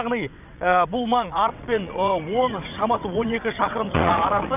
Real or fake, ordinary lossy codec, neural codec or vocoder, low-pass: fake; none; vocoder, 22.05 kHz, 80 mel bands, WaveNeXt; 3.6 kHz